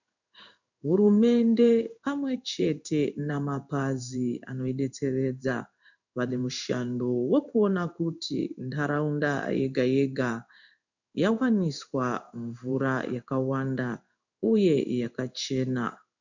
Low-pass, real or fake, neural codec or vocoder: 7.2 kHz; fake; codec, 16 kHz in and 24 kHz out, 1 kbps, XY-Tokenizer